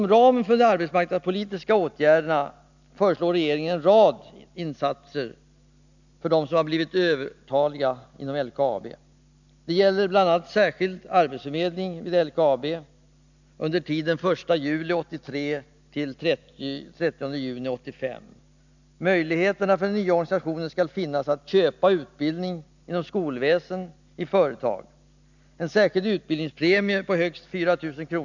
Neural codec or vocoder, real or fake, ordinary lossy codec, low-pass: none; real; none; 7.2 kHz